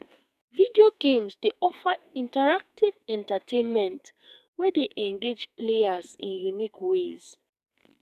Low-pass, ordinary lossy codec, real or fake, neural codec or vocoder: 14.4 kHz; none; fake; codec, 44.1 kHz, 2.6 kbps, SNAC